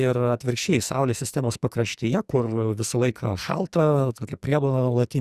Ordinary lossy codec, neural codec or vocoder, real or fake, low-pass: Opus, 64 kbps; codec, 44.1 kHz, 2.6 kbps, SNAC; fake; 14.4 kHz